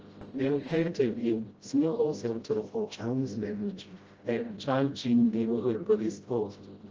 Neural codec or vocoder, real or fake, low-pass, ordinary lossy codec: codec, 16 kHz, 0.5 kbps, FreqCodec, smaller model; fake; 7.2 kHz; Opus, 24 kbps